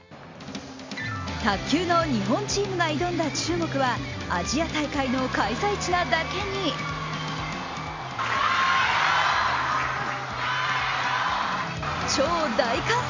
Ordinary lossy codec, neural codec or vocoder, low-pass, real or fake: MP3, 64 kbps; none; 7.2 kHz; real